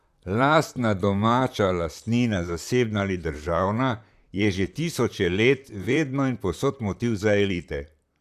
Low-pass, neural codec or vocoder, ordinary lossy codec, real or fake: 14.4 kHz; vocoder, 44.1 kHz, 128 mel bands, Pupu-Vocoder; none; fake